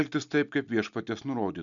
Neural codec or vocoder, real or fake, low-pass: none; real; 7.2 kHz